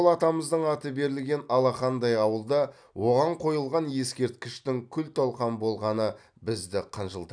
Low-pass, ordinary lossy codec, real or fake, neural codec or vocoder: 9.9 kHz; none; real; none